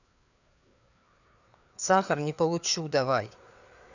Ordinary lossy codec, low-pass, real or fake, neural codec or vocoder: none; 7.2 kHz; fake; codec, 16 kHz, 4 kbps, FreqCodec, larger model